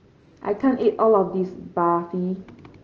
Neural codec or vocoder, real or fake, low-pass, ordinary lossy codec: none; real; 7.2 kHz; Opus, 16 kbps